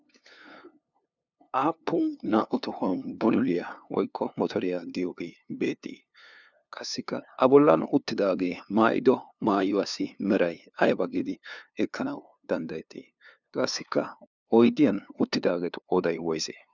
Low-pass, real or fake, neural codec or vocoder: 7.2 kHz; fake; codec, 16 kHz, 2 kbps, FunCodec, trained on LibriTTS, 25 frames a second